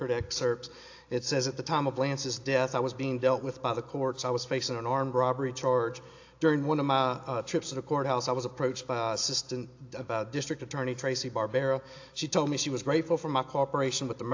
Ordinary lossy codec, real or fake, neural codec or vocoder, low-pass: AAC, 48 kbps; real; none; 7.2 kHz